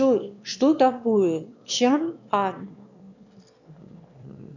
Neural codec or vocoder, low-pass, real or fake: autoencoder, 22.05 kHz, a latent of 192 numbers a frame, VITS, trained on one speaker; 7.2 kHz; fake